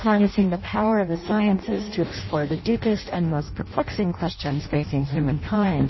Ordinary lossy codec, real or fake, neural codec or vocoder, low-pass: MP3, 24 kbps; fake; codec, 16 kHz in and 24 kHz out, 0.6 kbps, FireRedTTS-2 codec; 7.2 kHz